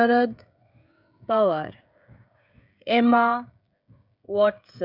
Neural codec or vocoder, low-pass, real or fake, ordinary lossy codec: codec, 16 kHz, 16 kbps, FreqCodec, smaller model; 5.4 kHz; fake; none